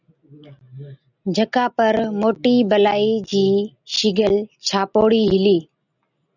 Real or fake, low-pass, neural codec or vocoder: real; 7.2 kHz; none